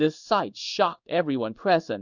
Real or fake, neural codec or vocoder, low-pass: fake; codec, 16 kHz in and 24 kHz out, 1 kbps, XY-Tokenizer; 7.2 kHz